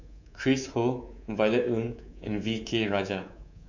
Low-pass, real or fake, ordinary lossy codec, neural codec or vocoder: 7.2 kHz; fake; none; codec, 24 kHz, 3.1 kbps, DualCodec